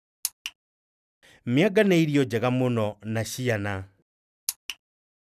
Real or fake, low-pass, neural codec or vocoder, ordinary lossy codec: real; 14.4 kHz; none; none